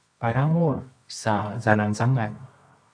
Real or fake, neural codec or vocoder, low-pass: fake; codec, 24 kHz, 0.9 kbps, WavTokenizer, medium music audio release; 9.9 kHz